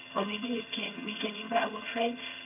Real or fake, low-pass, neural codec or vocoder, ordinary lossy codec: fake; 3.6 kHz; vocoder, 22.05 kHz, 80 mel bands, HiFi-GAN; none